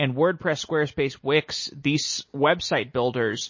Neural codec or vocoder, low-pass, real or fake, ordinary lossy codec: none; 7.2 kHz; real; MP3, 32 kbps